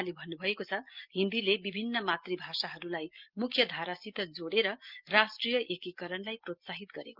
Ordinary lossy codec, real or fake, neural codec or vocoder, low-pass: Opus, 32 kbps; real; none; 5.4 kHz